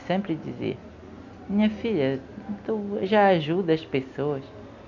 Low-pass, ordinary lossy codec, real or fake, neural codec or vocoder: 7.2 kHz; none; real; none